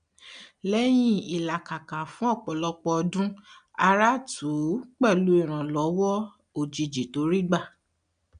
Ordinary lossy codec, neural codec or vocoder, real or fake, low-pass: none; none; real; 9.9 kHz